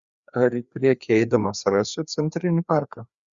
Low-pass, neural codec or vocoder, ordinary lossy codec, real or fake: 7.2 kHz; codec, 16 kHz, 2 kbps, FreqCodec, larger model; Opus, 64 kbps; fake